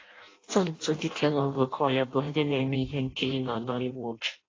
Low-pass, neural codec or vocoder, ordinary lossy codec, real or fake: 7.2 kHz; codec, 16 kHz in and 24 kHz out, 0.6 kbps, FireRedTTS-2 codec; AAC, 32 kbps; fake